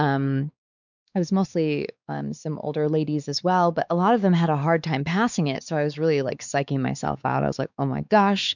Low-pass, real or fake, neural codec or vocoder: 7.2 kHz; fake; codec, 16 kHz, 4 kbps, X-Codec, WavLM features, trained on Multilingual LibriSpeech